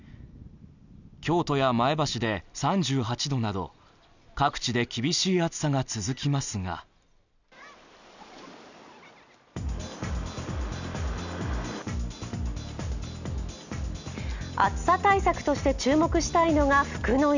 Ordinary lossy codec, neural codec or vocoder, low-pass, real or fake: none; none; 7.2 kHz; real